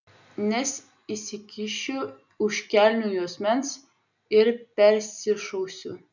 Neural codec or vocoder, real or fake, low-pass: none; real; 7.2 kHz